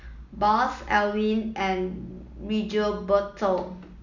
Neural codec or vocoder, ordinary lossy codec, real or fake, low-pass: none; none; real; 7.2 kHz